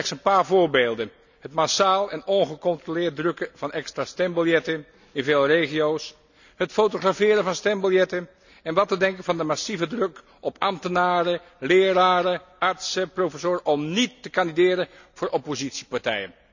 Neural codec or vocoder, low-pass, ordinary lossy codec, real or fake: none; 7.2 kHz; none; real